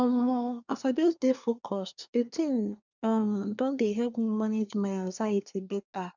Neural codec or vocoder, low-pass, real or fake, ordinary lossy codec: codec, 24 kHz, 1 kbps, SNAC; 7.2 kHz; fake; none